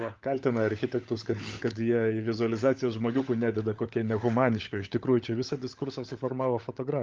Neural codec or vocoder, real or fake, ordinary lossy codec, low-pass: codec, 16 kHz, 16 kbps, FunCodec, trained on LibriTTS, 50 frames a second; fake; Opus, 32 kbps; 7.2 kHz